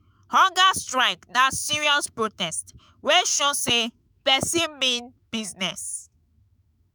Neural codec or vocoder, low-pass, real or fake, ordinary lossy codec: autoencoder, 48 kHz, 128 numbers a frame, DAC-VAE, trained on Japanese speech; none; fake; none